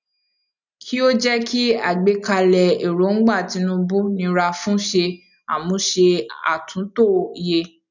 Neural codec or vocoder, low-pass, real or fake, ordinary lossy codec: none; 7.2 kHz; real; none